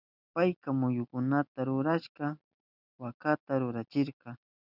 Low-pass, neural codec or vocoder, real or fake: 5.4 kHz; none; real